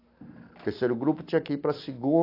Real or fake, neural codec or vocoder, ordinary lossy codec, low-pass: real; none; AAC, 32 kbps; 5.4 kHz